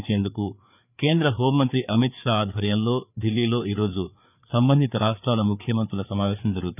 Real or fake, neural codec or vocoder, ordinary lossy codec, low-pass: fake; codec, 16 kHz, 4 kbps, FreqCodec, larger model; none; 3.6 kHz